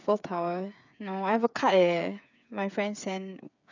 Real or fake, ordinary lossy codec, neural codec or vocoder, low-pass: fake; none; codec, 16 kHz, 8 kbps, FreqCodec, smaller model; 7.2 kHz